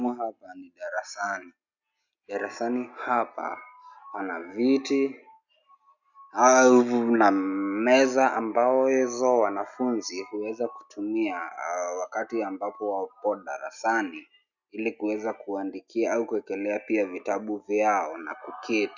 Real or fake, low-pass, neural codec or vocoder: real; 7.2 kHz; none